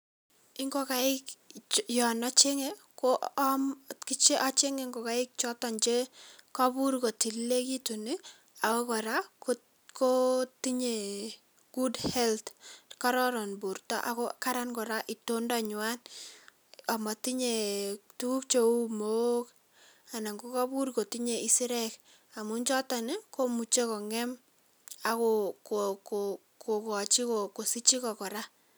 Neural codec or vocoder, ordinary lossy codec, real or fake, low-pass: none; none; real; none